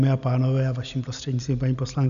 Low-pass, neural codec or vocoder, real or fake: 7.2 kHz; none; real